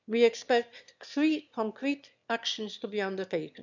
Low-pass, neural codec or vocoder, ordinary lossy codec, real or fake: 7.2 kHz; autoencoder, 22.05 kHz, a latent of 192 numbers a frame, VITS, trained on one speaker; none; fake